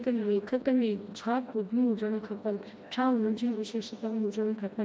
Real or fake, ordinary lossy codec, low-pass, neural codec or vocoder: fake; none; none; codec, 16 kHz, 1 kbps, FreqCodec, smaller model